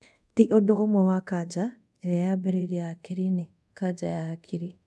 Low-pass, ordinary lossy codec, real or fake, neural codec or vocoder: none; none; fake; codec, 24 kHz, 0.5 kbps, DualCodec